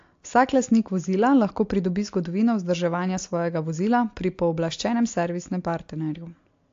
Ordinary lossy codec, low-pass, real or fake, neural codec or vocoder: AAC, 48 kbps; 7.2 kHz; real; none